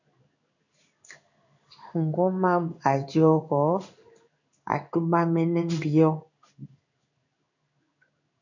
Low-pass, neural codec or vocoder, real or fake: 7.2 kHz; codec, 16 kHz in and 24 kHz out, 1 kbps, XY-Tokenizer; fake